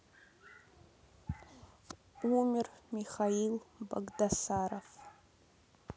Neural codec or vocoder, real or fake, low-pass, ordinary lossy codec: none; real; none; none